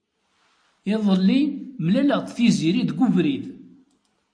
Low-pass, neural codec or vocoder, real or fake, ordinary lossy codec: 9.9 kHz; none; real; AAC, 48 kbps